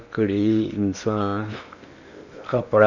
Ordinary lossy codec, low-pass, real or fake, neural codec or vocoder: none; 7.2 kHz; fake; codec, 16 kHz in and 24 kHz out, 0.8 kbps, FocalCodec, streaming, 65536 codes